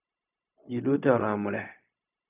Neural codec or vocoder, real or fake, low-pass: codec, 16 kHz, 0.4 kbps, LongCat-Audio-Codec; fake; 3.6 kHz